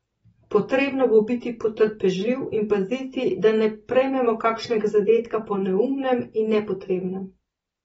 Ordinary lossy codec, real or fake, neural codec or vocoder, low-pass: AAC, 24 kbps; real; none; 19.8 kHz